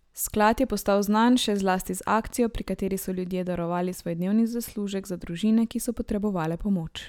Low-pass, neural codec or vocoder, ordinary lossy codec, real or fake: 19.8 kHz; none; none; real